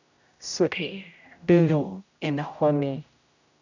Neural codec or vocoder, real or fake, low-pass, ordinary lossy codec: codec, 16 kHz, 0.5 kbps, X-Codec, HuBERT features, trained on general audio; fake; 7.2 kHz; none